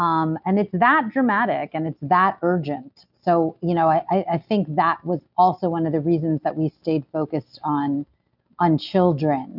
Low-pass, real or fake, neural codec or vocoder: 5.4 kHz; real; none